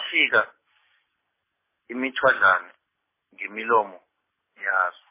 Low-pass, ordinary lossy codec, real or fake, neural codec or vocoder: 3.6 kHz; MP3, 16 kbps; real; none